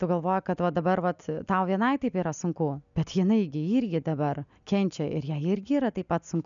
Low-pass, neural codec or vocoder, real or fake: 7.2 kHz; none; real